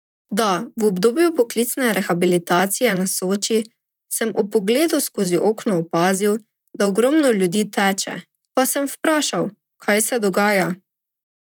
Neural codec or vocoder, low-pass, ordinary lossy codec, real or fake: vocoder, 44.1 kHz, 128 mel bands, Pupu-Vocoder; 19.8 kHz; none; fake